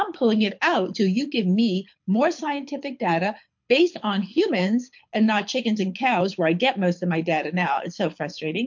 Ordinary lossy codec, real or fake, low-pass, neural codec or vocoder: MP3, 48 kbps; fake; 7.2 kHz; codec, 24 kHz, 6 kbps, HILCodec